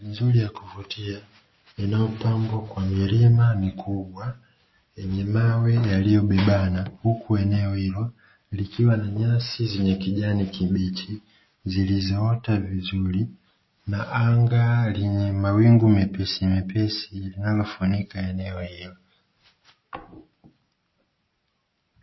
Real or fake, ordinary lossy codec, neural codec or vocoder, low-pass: real; MP3, 24 kbps; none; 7.2 kHz